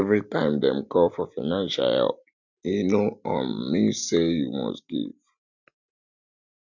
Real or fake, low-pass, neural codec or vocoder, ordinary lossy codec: real; 7.2 kHz; none; none